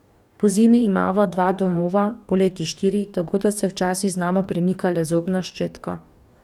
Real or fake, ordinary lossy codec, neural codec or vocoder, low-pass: fake; none; codec, 44.1 kHz, 2.6 kbps, DAC; 19.8 kHz